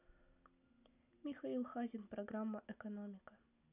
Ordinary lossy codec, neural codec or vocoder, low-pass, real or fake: none; none; 3.6 kHz; real